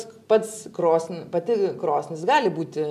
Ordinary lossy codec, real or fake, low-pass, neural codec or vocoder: MP3, 96 kbps; real; 14.4 kHz; none